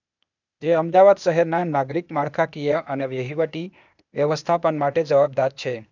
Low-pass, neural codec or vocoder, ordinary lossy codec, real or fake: 7.2 kHz; codec, 16 kHz, 0.8 kbps, ZipCodec; none; fake